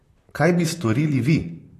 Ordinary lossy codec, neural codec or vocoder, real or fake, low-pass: AAC, 48 kbps; vocoder, 44.1 kHz, 128 mel bands, Pupu-Vocoder; fake; 14.4 kHz